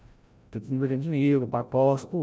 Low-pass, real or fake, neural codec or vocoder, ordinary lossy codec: none; fake; codec, 16 kHz, 0.5 kbps, FreqCodec, larger model; none